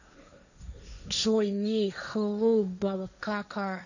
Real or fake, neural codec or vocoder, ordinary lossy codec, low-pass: fake; codec, 16 kHz, 1.1 kbps, Voila-Tokenizer; none; 7.2 kHz